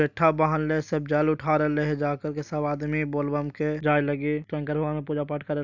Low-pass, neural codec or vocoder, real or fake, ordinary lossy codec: 7.2 kHz; none; real; none